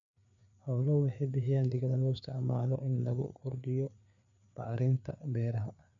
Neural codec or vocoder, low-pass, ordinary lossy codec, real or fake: codec, 16 kHz, 4 kbps, FreqCodec, larger model; 7.2 kHz; MP3, 96 kbps; fake